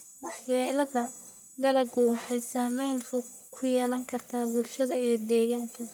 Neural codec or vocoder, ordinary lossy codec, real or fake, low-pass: codec, 44.1 kHz, 1.7 kbps, Pupu-Codec; none; fake; none